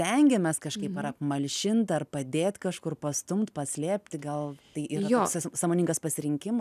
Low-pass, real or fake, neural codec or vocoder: 14.4 kHz; real; none